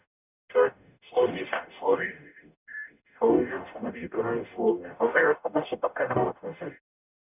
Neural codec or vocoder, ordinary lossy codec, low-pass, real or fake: codec, 44.1 kHz, 0.9 kbps, DAC; none; 3.6 kHz; fake